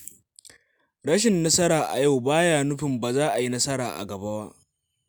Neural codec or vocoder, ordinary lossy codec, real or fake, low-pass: none; none; real; none